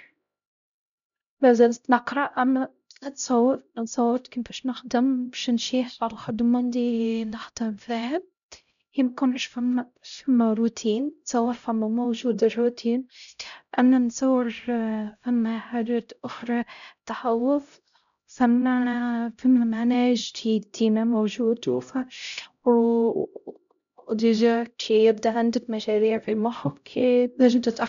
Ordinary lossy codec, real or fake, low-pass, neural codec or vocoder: none; fake; 7.2 kHz; codec, 16 kHz, 0.5 kbps, X-Codec, HuBERT features, trained on LibriSpeech